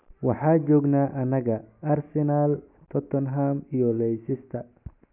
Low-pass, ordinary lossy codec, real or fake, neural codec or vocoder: 3.6 kHz; none; real; none